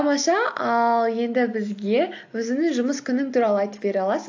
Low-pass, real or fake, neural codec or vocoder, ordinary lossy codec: 7.2 kHz; real; none; AAC, 48 kbps